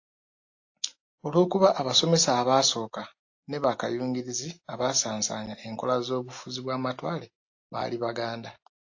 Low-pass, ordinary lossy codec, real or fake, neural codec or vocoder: 7.2 kHz; AAC, 48 kbps; real; none